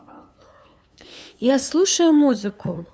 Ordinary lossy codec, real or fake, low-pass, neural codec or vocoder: none; fake; none; codec, 16 kHz, 2 kbps, FunCodec, trained on LibriTTS, 25 frames a second